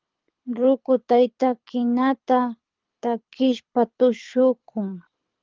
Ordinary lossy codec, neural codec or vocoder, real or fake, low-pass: Opus, 32 kbps; codec, 24 kHz, 6 kbps, HILCodec; fake; 7.2 kHz